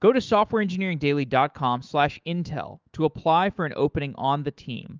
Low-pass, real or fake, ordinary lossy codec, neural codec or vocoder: 7.2 kHz; fake; Opus, 32 kbps; autoencoder, 48 kHz, 128 numbers a frame, DAC-VAE, trained on Japanese speech